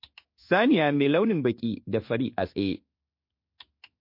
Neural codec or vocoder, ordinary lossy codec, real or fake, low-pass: codec, 16 kHz in and 24 kHz out, 1 kbps, XY-Tokenizer; MP3, 32 kbps; fake; 5.4 kHz